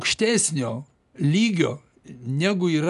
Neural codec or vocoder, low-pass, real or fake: none; 10.8 kHz; real